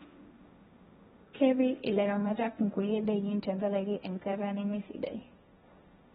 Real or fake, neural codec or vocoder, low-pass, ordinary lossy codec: fake; codec, 16 kHz, 1.1 kbps, Voila-Tokenizer; 7.2 kHz; AAC, 16 kbps